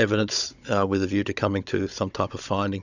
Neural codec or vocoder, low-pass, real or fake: codec, 16 kHz, 16 kbps, FunCodec, trained on Chinese and English, 50 frames a second; 7.2 kHz; fake